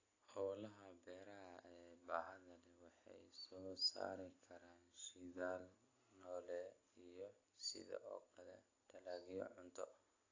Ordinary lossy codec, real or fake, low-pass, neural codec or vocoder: AAC, 32 kbps; real; 7.2 kHz; none